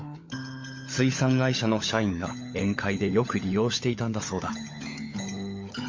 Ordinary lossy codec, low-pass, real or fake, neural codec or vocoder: MP3, 48 kbps; 7.2 kHz; fake; codec, 16 kHz, 16 kbps, FunCodec, trained on LibriTTS, 50 frames a second